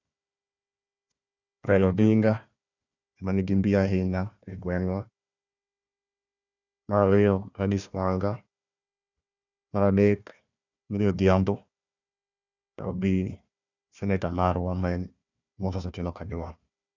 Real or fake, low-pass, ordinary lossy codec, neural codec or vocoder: fake; 7.2 kHz; none; codec, 16 kHz, 1 kbps, FunCodec, trained on Chinese and English, 50 frames a second